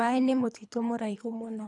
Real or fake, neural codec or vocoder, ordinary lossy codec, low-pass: fake; codec, 24 kHz, 3 kbps, HILCodec; none; 10.8 kHz